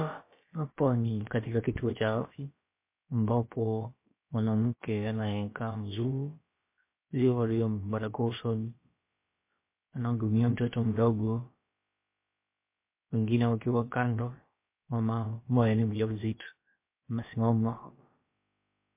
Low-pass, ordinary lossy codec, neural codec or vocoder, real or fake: 3.6 kHz; MP3, 24 kbps; codec, 16 kHz, about 1 kbps, DyCAST, with the encoder's durations; fake